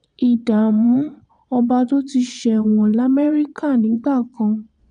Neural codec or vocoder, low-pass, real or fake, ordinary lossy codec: vocoder, 22.05 kHz, 80 mel bands, Vocos; 9.9 kHz; fake; none